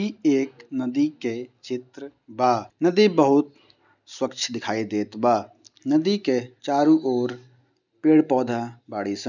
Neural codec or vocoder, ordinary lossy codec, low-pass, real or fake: none; none; 7.2 kHz; real